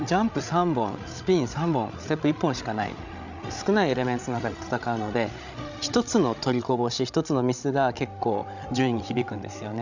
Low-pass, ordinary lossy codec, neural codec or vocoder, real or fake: 7.2 kHz; none; codec, 16 kHz, 16 kbps, FreqCodec, larger model; fake